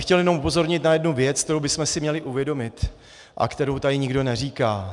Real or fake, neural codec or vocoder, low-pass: real; none; 10.8 kHz